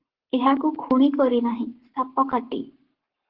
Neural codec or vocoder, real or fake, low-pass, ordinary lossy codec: codec, 44.1 kHz, 7.8 kbps, Pupu-Codec; fake; 5.4 kHz; Opus, 16 kbps